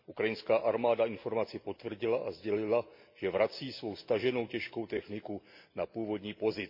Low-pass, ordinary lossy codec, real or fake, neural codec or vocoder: 5.4 kHz; MP3, 32 kbps; real; none